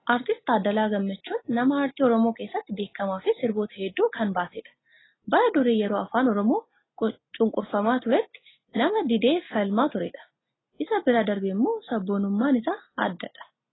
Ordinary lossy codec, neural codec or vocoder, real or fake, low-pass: AAC, 16 kbps; none; real; 7.2 kHz